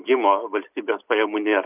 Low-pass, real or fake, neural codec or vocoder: 3.6 kHz; real; none